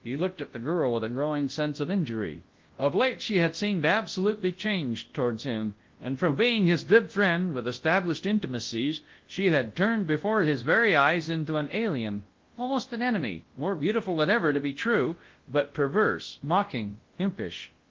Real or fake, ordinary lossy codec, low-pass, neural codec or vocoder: fake; Opus, 16 kbps; 7.2 kHz; codec, 24 kHz, 0.9 kbps, WavTokenizer, large speech release